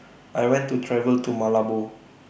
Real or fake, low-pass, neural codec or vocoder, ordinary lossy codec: real; none; none; none